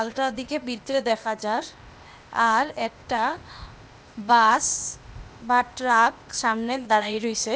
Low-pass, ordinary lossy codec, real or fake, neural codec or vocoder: none; none; fake; codec, 16 kHz, 0.8 kbps, ZipCodec